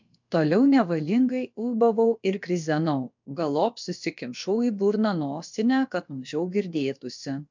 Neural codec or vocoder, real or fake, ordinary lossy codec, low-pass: codec, 16 kHz, about 1 kbps, DyCAST, with the encoder's durations; fake; MP3, 64 kbps; 7.2 kHz